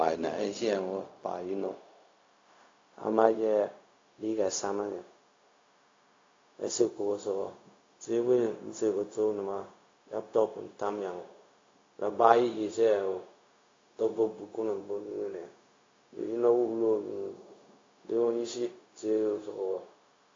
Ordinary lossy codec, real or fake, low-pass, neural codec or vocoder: AAC, 32 kbps; fake; 7.2 kHz; codec, 16 kHz, 0.4 kbps, LongCat-Audio-Codec